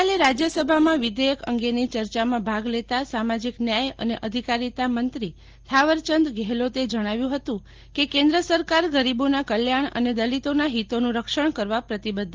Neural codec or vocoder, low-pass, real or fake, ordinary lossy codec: none; 7.2 kHz; real; Opus, 16 kbps